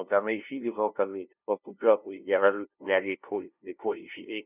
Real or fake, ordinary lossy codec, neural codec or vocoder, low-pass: fake; none; codec, 16 kHz, 0.5 kbps, FunCodec, trained on LibriTTS, 25 frames a second; 3.6 kHz